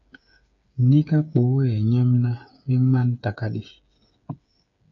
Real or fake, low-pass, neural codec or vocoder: fake; 7.2 kHz; codec, 16 kHz, 8 kbps, FreqCodec, smaller model